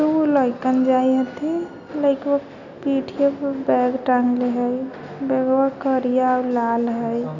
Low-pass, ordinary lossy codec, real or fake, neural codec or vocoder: 7.2 kHz; none; real; none